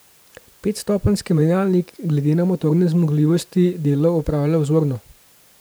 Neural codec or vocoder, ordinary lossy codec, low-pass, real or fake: vocoder, 44.1 kHz, 128 mel bands every 512 samples, BigVGAN v2; none; none; fake